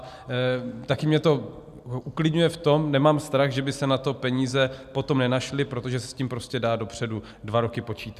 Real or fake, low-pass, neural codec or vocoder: real; 14.4 kHz; none